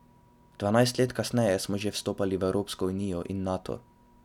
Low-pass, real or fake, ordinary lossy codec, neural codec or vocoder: 19.8 kHz; real; none; none